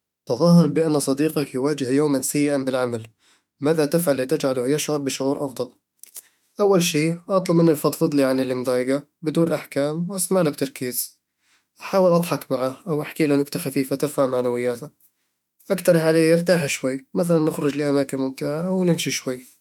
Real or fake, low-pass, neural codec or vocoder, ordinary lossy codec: fake; 19.8 kHz; autoencoder, 48 kHz, 32 numbers a frame, DAC-VAE, trained on Japanese speech; none